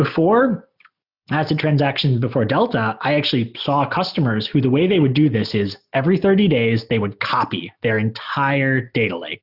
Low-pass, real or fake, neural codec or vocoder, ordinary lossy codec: 5.4 kHz; real; none; Opus, 64 kbps